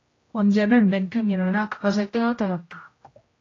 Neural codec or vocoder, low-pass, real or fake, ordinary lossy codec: codec, 16 kHz, 0.5 kbps, X-Codec, HuBERT features, trained on general audio; 7.2 kHz; fake; AAC, 32 kbps